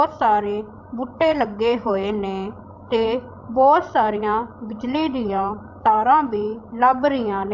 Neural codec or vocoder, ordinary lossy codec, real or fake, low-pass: codec, 16 kHz, 16 kbps, FreqCodec, larger model; none; fake; 7.2 kHz